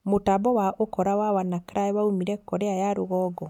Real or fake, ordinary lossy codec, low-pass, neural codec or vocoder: real; none; 19.8 kHz; none